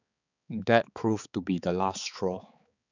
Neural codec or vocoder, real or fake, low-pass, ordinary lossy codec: codec, 16 kHz, 4 kbps, X-Codec, HuBERT features, trained on balanced general audio; fake; 7.2 kHz; none